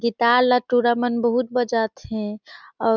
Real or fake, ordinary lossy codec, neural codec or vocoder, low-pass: real; none; none; none